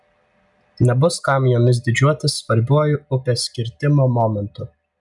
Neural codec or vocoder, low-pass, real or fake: none; 10.8 kHz; real